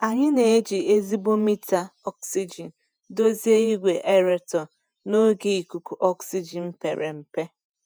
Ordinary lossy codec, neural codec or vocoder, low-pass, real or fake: none; vocoder, 48 kHz, 128 mel bands, Vocos; none; fake